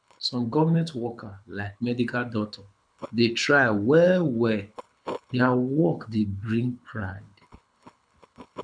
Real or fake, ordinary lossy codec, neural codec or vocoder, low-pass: fake; none; codec, 24 kHz, 6 kbps, HILCodec; 9.9 kHz